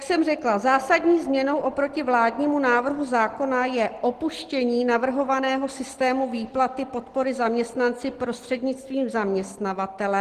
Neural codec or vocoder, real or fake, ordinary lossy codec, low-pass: none; real; Opus, 16 kbps; 14.4 kHz